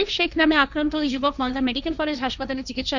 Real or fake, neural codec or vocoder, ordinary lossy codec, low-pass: fake; codec, 16 kHz, 1.1 kbps, Voila-Tokenizer; none; 7.2 kHz